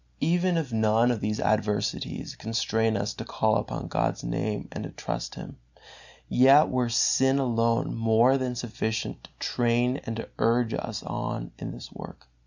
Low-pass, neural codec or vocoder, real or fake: 7.2 kHz; none; real